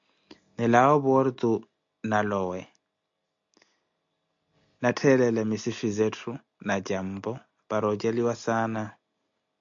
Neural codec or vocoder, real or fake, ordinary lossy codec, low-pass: none; real; AAC, 64 kbps; 7.2 kHz